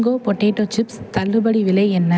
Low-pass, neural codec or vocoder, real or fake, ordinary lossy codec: none; none; real; none